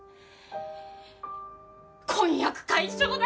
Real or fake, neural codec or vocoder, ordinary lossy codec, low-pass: real; none; none; none